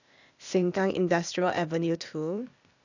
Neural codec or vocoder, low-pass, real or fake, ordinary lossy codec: codec, 16 kHz, 0.8 kbps, ZipCodec; 7.2 kHz; fake; none